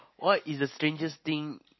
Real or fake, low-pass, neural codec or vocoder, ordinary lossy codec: real; 7.2 kHz; none; MP3, 24 kbps